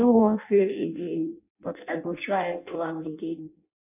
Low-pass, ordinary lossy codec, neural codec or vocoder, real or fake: 3.6 kHz; none; codec, 16 kHz in and 24 kHz out, 0.6 kbps, FireRedTTS-2 codec; fake